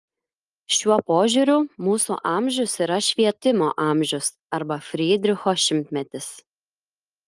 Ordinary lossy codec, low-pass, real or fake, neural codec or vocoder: Opus, 32 kbps; 10.8 kHz; real; none